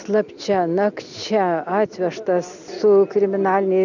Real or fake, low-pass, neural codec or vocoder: real; 7.2 kHz; none